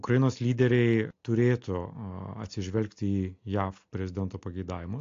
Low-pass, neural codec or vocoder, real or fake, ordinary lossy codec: 7.2 kHz; none; real; AAC, 48 kbps